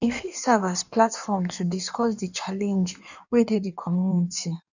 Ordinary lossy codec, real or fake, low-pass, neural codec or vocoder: none; fake; 7.2 kHz; codec, 16 kHz in and 24 kHz out, 1.1 kbps, FireRedTTS-2 codec